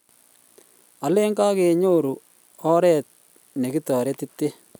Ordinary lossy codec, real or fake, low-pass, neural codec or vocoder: none; real; none; none